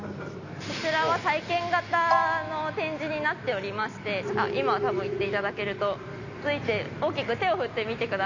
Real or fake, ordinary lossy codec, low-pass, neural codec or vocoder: real; none; 7.2 kHz; none